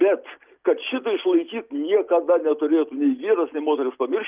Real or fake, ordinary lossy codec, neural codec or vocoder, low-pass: real; Opus, 24 kbps; none; 3.6 kHz